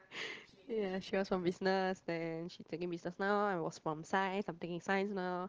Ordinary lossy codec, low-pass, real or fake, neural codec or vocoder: Opus, 16 kbps; 7.2 kHz; real; none